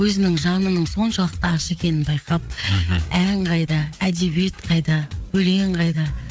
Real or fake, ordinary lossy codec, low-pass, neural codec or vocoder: fake; none; none; codec, 16 kHz, 4 kbps, FreqCodec, larger model